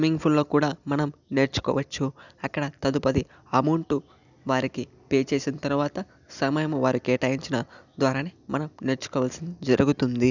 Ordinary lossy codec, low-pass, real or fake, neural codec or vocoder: none; 7.2 kHz; real; none